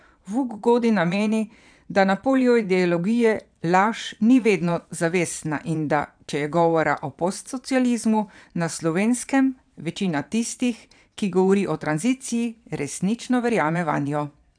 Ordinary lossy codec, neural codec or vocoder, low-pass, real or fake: none; vocoder, 22.05 kHz, 80 mel bands, Vocos; 9.9 kHz; fake